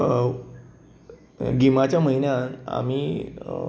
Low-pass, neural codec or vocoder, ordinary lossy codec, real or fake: none; none; none; real